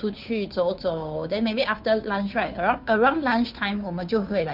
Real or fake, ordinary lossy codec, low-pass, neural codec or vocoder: fake; none; 5.4 kHz; codec, 16 kHz, 2 kbps, FunCodec, trained on Chinese and English, 25 frames a second